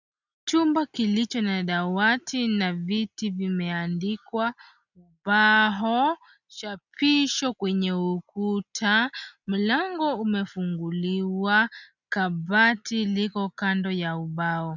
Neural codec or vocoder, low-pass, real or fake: none; 7.2 kHz; real